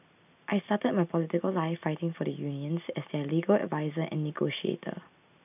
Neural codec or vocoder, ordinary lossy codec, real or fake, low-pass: none; none; real; 3.6 kHz